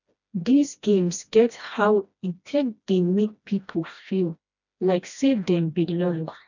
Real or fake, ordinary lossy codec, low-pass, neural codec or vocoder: fake; none; 7.2 kHz; codec, 16 kHz, 1 kbps, FreqCodec, smaller model